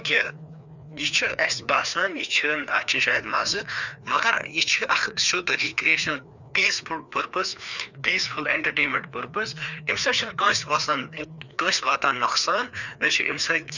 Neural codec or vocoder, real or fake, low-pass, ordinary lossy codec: codec, 16 kHz, 2 kbps, FreqCodec, larger model; fake; 7.2 kHz; none